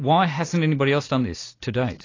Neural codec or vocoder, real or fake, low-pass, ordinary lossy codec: none; real; 7.2 kHz; AAC, 32 kbps